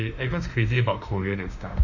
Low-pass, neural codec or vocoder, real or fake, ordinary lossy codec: 7.2 kHz; autoencoder, 48 kHz, 32 numbers a frame, DAC-VAE, trained on Japanese speech; fake; none